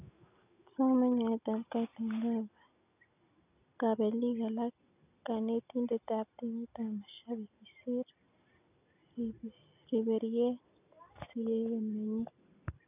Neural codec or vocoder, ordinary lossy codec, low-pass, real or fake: none; none; 3.6 kHz; real